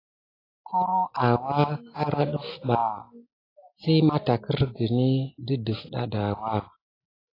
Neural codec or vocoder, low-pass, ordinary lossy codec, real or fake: none; 5.4 kHz; AAC, 24 kbps; real